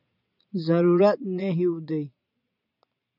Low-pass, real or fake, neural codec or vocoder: 5.4 kHz; fake; vocoder, 22.05 kHz, 80 mel bands, Vocos